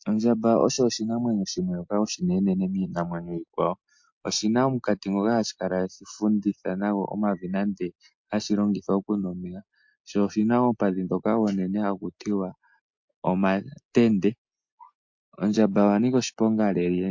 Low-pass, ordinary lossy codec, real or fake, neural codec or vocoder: 7.2 kHz; MP3, 48 kbps; real; none